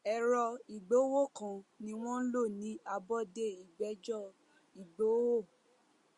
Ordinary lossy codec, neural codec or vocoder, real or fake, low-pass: Opus, 64 kbps; none; real; 10.8 kHz